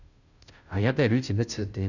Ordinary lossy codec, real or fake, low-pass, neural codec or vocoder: none; fake; 7.2 kHz; codec, 16 kHz, 0.5 kbps, FunCodec, trained on Chinese and English, 25 frames a second